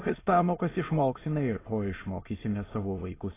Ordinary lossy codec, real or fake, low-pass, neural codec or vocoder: AAC, 16 kbps; fake; 3.6 kHz; autoencoder, 22.05 kHz, a latent of 192 numbers a frame, VITS, trained on many speakers